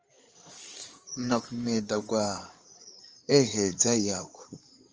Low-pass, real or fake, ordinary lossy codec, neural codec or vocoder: 7.2 kHz; fake; Opus, 24 kbps; codec, 16 kHz in and 24 kHz out, 2.2 kbps, FireRedTTS-2 codec